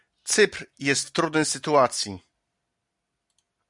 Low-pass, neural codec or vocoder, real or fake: 10.8 kHz; none; real